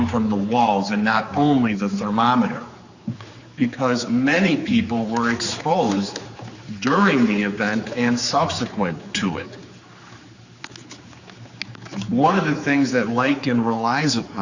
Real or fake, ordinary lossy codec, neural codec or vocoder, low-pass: fake; Opus, 64 kbps; codec, 16 kHz, 4 kbps, X-Codec, HuBERT features, trained on general audio; 7.2 kHz